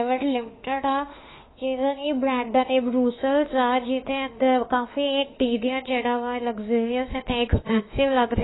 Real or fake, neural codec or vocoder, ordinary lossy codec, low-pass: fake; autoencoder, 48 kHz, 32 numbers a frame, DAC-VAE, trained on Japanese speech; AAC, 16 kbps; 7.2 kHz